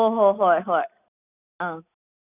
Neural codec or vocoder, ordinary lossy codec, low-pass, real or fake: none; none; 3.6 kHz; real